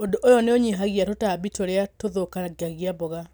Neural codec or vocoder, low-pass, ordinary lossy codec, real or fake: none; none; none; real